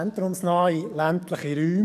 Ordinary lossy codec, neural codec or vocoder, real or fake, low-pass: none; codec, 44.1 kHz, 7.8 kbps, DAC; fake; 14.4 kHz